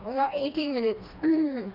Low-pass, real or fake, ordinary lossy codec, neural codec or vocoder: 5.4 kHz; fake; none; codec, 16 kHz, 2 kbps, FreqCodec, smaller model